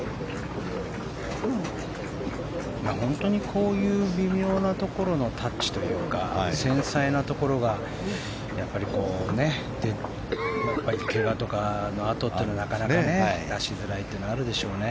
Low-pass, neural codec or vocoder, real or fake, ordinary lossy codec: none; none; real; none